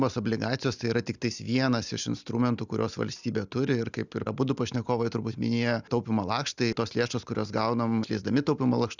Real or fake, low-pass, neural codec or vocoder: real; 7.2 kHz; none